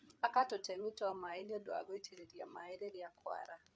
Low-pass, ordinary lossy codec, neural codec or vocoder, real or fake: none; none; codec, 16 kHz, 8 kbps, FreqCodec, larger model; fake